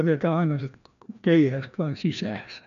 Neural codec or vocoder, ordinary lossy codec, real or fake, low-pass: codec, 16 kHz, 1 kbps, FreqCodec, larger model; none; fake; 7.2 kHz